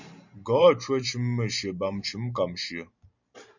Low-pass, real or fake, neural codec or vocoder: 7.2 kHz; fake; vocoder, 44.1 kHz, 128 mel bands every 512 samples, BigVGAN v2